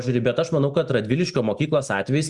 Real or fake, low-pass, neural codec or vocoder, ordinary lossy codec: real; 10.8 kHz; none; Opus, 64 kbps